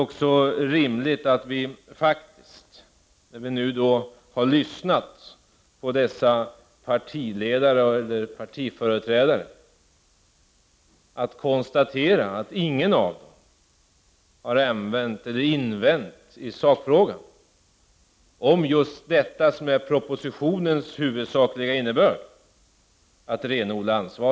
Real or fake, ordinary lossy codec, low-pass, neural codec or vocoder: real; none; none; none